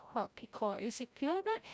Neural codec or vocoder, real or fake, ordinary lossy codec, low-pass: codec, 16 kHz, 0.5 kbps, FreqCodec, larger model; fake; none; none